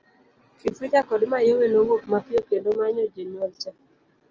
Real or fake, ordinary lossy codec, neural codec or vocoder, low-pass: real; Opus, 24 kbps; none; 7.2 kHz